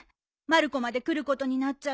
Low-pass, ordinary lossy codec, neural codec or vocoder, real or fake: none; none; none; real